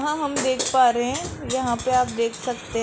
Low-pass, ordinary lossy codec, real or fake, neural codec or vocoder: none; none; real; none